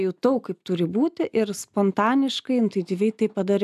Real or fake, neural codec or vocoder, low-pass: real; none; 14.4 kHz